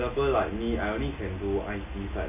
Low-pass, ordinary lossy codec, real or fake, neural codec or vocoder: 3.6 kHz; AAC, 32 kbps; real; none